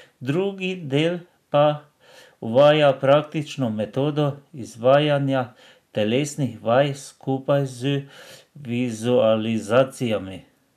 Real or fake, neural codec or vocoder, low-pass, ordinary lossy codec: real; none; 14.4 kHz; none